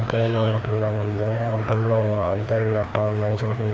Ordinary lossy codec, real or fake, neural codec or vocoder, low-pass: none; fake; codec, 16 kHz, 2 kbps, FreqCodec, larger model; none